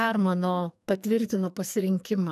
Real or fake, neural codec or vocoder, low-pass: fake; codec, 44.1 kHz, 2.6 kbps, SNAC; 14.4 kHz